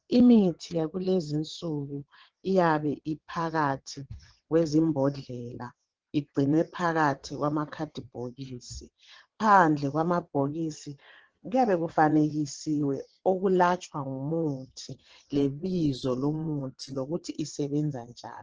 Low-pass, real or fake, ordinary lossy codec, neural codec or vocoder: 7.2 kHz; fake; Opus, 16 kbps; vocoder, 22.05 kHz, 80 mel bands, WaveNeXt